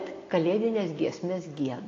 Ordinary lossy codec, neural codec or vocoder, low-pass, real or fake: AAC, 32 kbps; none; 7.2 kHz; real